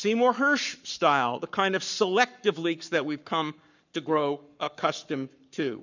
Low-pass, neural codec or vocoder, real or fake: 7.2 kHz; codec, 44.1 kHz, 7.8 kbps, Pupu-Codec; fake